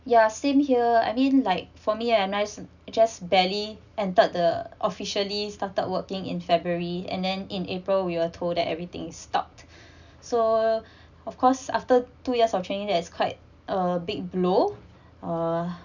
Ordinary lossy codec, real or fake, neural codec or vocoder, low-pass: none; real; none; 7.2 kHz